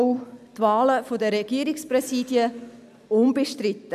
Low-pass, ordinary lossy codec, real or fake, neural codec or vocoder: 14.4 kHz; none; real; none